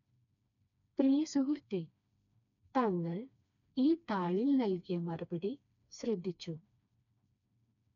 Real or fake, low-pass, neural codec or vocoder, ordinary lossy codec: fake; 7.2 kHz; codec, 16 kHz, 2 kbps, FreqCodec, smaller model; none